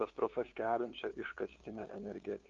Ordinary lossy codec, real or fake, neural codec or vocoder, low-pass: Opus, 32 kbps; fake; codec, 16 kHz, 2 kbps, FunCodec, trained on LibriTTS, 25 frames a second; 7.2 kHz